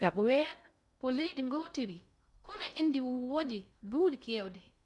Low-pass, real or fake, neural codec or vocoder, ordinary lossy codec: 10.8 kHz; fake; codec, 16 kHz in and 24 kHz out, 0.6 kbps, FocalCodec, streaming, 2048 codes; none